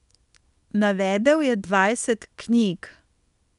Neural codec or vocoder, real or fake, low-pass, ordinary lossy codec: codec, 24 kHz, 0.9 kbps, WavTokenizer, small release; fake; 10.8 kHz; none